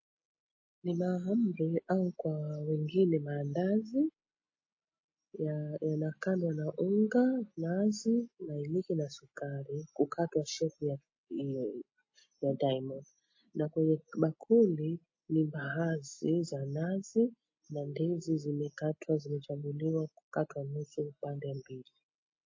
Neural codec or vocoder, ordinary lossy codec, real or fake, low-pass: none; MP3, 32 kbps; real; 7.2 kHz